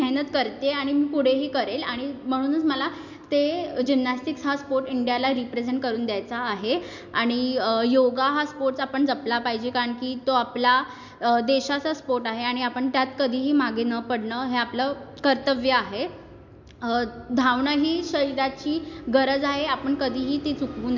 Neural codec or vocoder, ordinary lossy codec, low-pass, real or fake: none; MP3, 64 kbps; 7.2 kHz; real